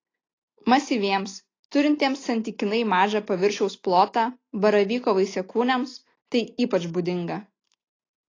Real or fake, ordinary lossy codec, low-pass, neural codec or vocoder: real; AAC, 32 kbps; 7.2 kHz; none